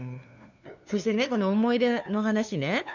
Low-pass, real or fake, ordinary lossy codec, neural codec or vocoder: 7.2 kHz; fake; none; codec, 16 kHz, 2 kbps, FunCodec, trained on LibriTTS, 25 frames a second